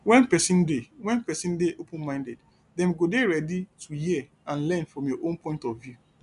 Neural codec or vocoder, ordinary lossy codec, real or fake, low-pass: none; none; real; 10.8 kHz